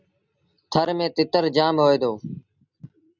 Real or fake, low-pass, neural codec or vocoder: real; 7.2 kHz; none